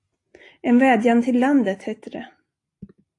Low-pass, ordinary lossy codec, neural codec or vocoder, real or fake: 10.8 kHz; AAC, 48 kbps; none; real